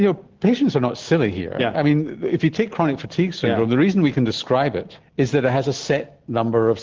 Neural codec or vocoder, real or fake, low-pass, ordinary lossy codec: none; real; 7.2 kHz; Opus, 16 kbps